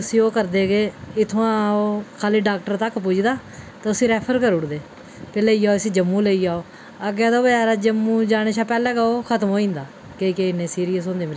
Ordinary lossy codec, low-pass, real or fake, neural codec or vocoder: none; none; real; none